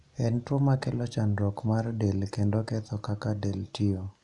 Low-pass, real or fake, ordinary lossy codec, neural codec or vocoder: 10.8 kHz; real; Opus, 64 kbps; none